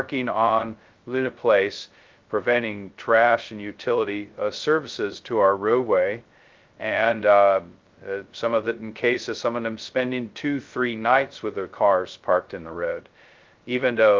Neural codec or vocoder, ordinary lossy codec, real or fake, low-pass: codec, 16 kHz, 0.2 kbps, FocalCodec; Opus, 32 kbps; fake; 7.2 kHz